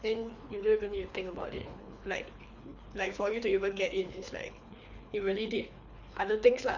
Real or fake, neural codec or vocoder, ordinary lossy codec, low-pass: fake; codec, 24 kHz, 3 kbps, HILCodec; Opus, 64 kbps; 7.2 kHz